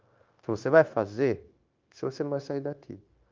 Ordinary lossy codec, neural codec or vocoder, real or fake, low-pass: Opus, 24 kbps; codec, 16 kHz, 0.9 kbps, LongCat-Audio-Codec; fake; 7.2 kHz